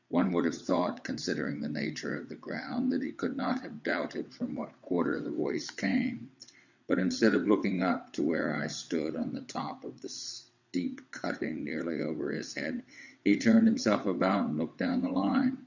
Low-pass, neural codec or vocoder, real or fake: 7.2 kHz; vocoder, 22.05 kHz, 80 mel bands, WaveNeXt; fake